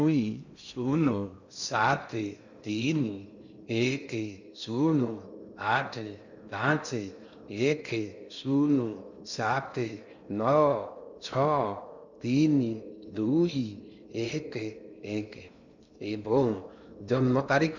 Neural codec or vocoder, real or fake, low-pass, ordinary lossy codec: codec, 16 kHz in and 24 kHz out, 0.6 kbps, FocalCodec, streaming, 2048 codes; fake; 7.2 kHz; none